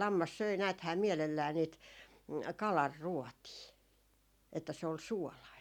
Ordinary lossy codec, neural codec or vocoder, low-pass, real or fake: none; none; 19.8 kHz; real